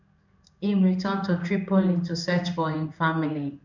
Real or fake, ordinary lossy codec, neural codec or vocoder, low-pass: fake; none; codec, 16 kHz in and 24 kHz out, 1 kbps, XY-Tokenizer; 7.2 kHz